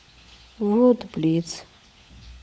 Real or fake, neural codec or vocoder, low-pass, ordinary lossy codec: fake; codec, 16 kHz, 16 kbps, FunCodec, trained on LibriTTS, 50 frames a second; none; none